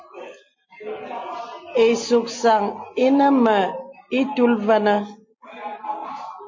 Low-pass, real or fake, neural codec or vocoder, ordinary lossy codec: 7.2 kHz; real; none; MP3, 32 kbps